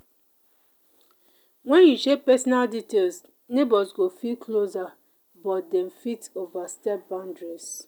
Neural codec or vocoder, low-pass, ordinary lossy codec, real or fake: none; none; none; real